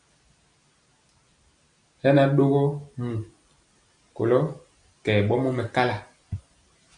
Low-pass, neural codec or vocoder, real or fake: 9.9 kHz; none; real